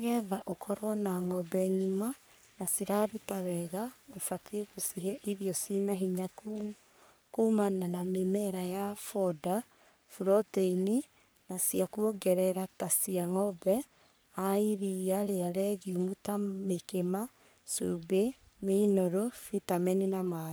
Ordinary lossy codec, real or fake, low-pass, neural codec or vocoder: none; fake; none; codec, 44.1 kHz, 3.4 kbps, Pupu-Codec